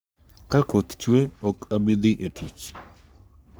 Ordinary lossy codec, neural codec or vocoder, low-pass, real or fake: none; codec, 44.1 kHz, 3.4 kbps, Pupu-Codec; none; fake